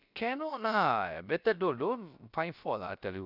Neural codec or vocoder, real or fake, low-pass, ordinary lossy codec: codec, 16 kHz, about 1 kbps, DyCAST, with the encoder's durations; fake; 5.4 kHz; none